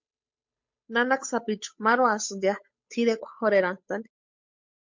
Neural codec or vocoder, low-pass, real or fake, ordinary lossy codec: codec, 16 kHz, 8 kbps, FunCodec, trained on Chinese and English, 25 frames a second; 7.2 kHz; fake; MP3, 48 kbps